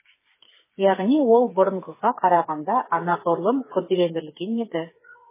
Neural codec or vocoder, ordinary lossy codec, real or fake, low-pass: codec, 16 kHz, 4 kbps, FreqCodec, smaller model; MP3, 16 kbps; fake; 3.6 kHz